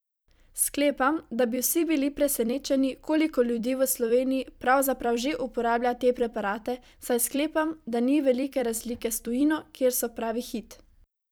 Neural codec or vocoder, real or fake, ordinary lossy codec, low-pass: vocoder, 44.1 kHz, 128 mel bands every 256 samples, BigVGAN v2; fake; none; none